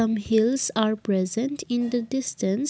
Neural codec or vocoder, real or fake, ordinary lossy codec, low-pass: none; real; none; none